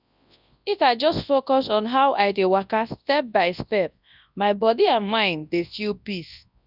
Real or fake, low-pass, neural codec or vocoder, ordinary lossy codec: fake; 5.4 kHz; codec, 24 kHz, 0.9 kbps, WavTokenizer, large speech release; none